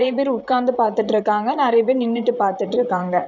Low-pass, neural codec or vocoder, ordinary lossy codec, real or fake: 7.2 kHz; vocoder, 44.1 kHz, 128 mel bands, Pupu-Vocoder; none; fake